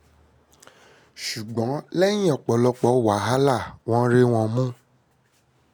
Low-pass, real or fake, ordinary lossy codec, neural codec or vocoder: none; real; none; none